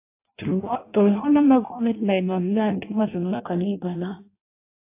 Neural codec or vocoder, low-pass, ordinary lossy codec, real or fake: codec, 16 kHz in and 24 kHz out, 0.6 kbps, FireRedTTS-2 codec; 3.6 kHz; AAC, 24 kbps; fake